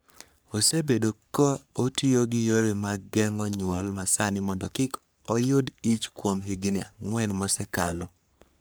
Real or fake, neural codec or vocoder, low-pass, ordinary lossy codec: fake; codec, 44.1 kHz, 3.4 kbps, Pupu-Codec; none; none